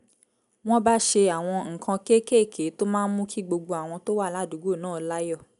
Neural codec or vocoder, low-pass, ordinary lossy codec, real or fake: none; 10.8 kHz; none; real